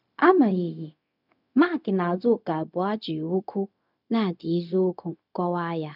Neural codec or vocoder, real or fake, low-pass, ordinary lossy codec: codec, 16 kHz, 0.4 kbps, LongCat-Audio-Codec; fake; 5.4 kHz; none